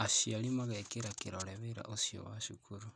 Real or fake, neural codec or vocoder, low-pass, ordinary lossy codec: real; none; 9.9 kHz; none